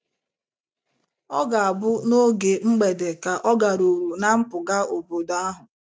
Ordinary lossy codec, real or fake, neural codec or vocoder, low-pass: none; real; none; none